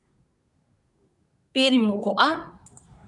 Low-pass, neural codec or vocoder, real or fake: 10.8 kHz; codec, 24 kHz, 1 kbps, SNAC; fake